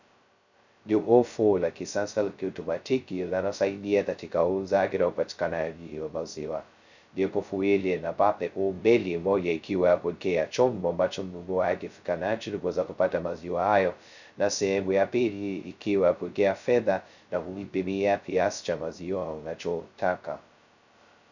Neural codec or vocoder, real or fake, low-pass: codec, 16 kHz, 0.2 kbps, FocalCodec; fake; 7.2 kHz